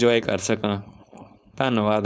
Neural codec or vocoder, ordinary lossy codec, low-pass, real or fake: codec, 16 kHz, 4.8 kbps, FACodec; none; none; fake